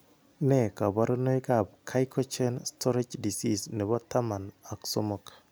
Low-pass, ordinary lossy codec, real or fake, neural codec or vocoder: none; none; real; none